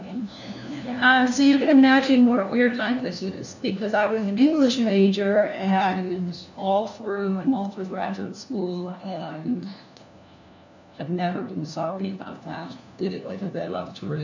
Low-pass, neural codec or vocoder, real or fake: 7.2 kHz; codec, 16 kHz, 1 kbps, FunCodec, trained on LibriTTS, 50 frames a second; fake